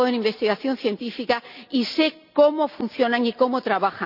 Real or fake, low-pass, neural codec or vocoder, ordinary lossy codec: real; 5.4 kHz; none; none